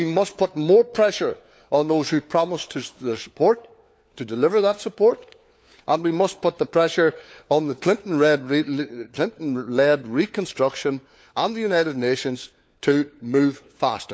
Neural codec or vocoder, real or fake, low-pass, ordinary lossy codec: codec, 16 kHz, 4 kbps, FunCodec, trained on LibriTTS, 50 frames a second; fake; none; none